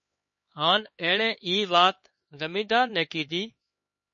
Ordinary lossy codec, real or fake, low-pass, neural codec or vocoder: MP3, 32 kbps; fake; 7.2 kHz; codec, 16 kHz, 4 kbps, X-Codec, HuBERT features, trained on LibriSpeech